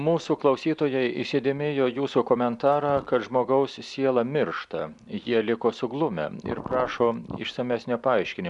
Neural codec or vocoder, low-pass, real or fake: none; 10.8 kHz; real